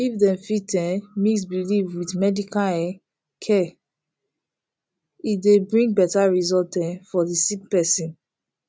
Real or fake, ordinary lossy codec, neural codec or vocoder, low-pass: real; none; none; none